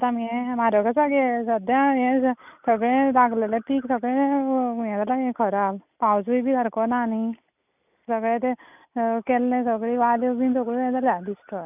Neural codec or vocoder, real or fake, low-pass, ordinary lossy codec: none; real; 3.6 kHz; none